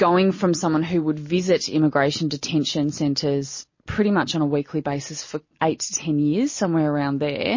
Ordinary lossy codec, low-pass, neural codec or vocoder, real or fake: MP3, 32 kbps; 7.2 kHz; none; real